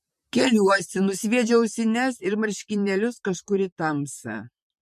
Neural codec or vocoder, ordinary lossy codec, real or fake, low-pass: vocoder, 44.1 kHz, 128 mel bands, Pupu-Vocoder; MP3, 64 kbps; fake; 14.4 kHz